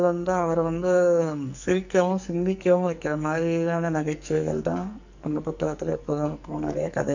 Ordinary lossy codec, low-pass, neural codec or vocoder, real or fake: none; 7.2 kHz; codec, 44.1 kHz, 2.6 kbps, SNAC; fake